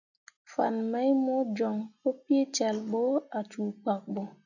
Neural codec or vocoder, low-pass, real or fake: none; 7.2 kHz; real